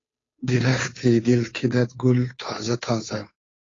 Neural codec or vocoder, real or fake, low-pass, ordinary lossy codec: codec, 16 kHz, 2 kbps, FunCodec, trained on Chinese and English, 25 frames a second; fake; 7.2 kHz; AAC, 32 kbps